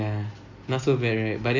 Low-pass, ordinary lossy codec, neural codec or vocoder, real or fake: 7.2 kHz; AAC, 48 kbps; none; real